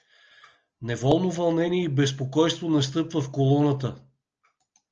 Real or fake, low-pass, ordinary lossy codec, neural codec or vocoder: real; 7.2 kHz; Opus, 24 kbps; none